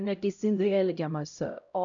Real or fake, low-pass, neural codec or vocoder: fake; 7.2 kHz; codec, 16 kHz, 0.5 kbps, X-Codec, HuBERT features, trained on LibriSpeech